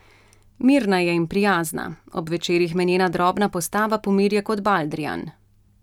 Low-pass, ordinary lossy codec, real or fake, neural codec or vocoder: 19.8 kHz; none; real; none